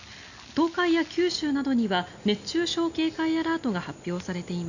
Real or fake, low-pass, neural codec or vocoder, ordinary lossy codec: real; 7.2 kHz; none; none